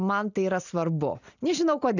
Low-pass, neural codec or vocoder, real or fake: 7.2 kHz; none; real